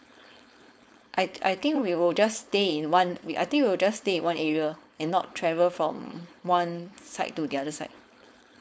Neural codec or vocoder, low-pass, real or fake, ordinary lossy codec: codec, 16 kHz, 4.8 kbps, FACodec; none; fake; none